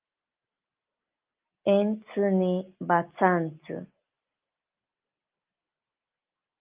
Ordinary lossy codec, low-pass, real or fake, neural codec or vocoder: Opus, 32 kbps; 3.6 kHz; real; none